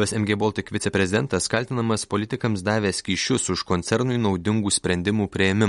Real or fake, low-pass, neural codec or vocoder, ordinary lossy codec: real; 19.8 kHz; none; MP3, 48 kbps